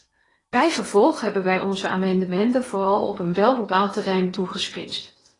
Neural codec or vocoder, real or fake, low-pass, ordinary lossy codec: codec, 16 kHz in and 24 kHz out, 0.8 kbps, FocalCodec, streaming, 65536 codes; fake; 10.8 kHz; AAC, 32 kbps